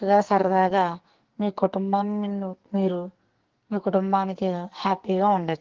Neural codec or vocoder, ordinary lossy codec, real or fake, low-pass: codec, 44.1 kHz, 2.6 kbps, SNAC; Opus, 16 kbps; fake; 7.2 kHz